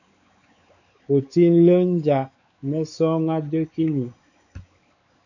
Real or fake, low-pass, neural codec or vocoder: fake; 7.2 kHz; codec, 16 kHz, 4 kbps, X-Codec, WavLM features, trained on Multilingual LibriSpeech